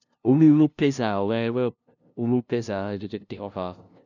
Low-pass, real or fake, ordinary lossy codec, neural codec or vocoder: 7.2 kHz; fake; none; codec, 16 kHz, 0.5 kbps, FunCodec, trained on LibriTTS, 25 frames a second